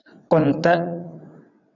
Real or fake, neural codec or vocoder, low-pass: fake; vocoder, 22.05 kHz, 80 mel bands, WaveNeXt; 7.2 kHz